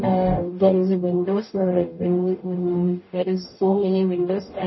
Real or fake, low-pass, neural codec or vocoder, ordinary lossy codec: fake; 7.2 kHz; codec, 44.1 kHz, 0.9 kbps, DAC; MP3, 24 kbps